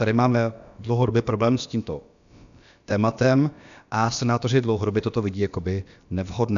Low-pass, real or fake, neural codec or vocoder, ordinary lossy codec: 7.2 kHz; fake; codec, 16 kHz, about 1 kbps, DyCAST, with the encoder's durations; MP3, 96 kbps